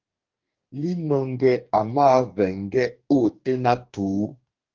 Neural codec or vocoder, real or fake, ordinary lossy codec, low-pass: codec, 44.1 kHz, 2.6 kbps, DAC; fake; Opus, 32 kbps; 7.2 kHz